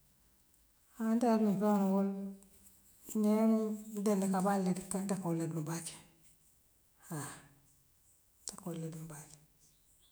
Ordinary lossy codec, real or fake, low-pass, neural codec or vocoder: none; fake; none; autoencoder, 48 kHz, 128 numbers a frame, DAC-VAE, trained on Japanese speech